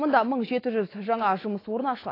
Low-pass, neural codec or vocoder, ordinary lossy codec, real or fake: 5.4 kHz; autoencoder, 48 kHz, 128 numbers a frame, DAC-VAE, trained on Japanese speech; AAC, 24 kbps; fake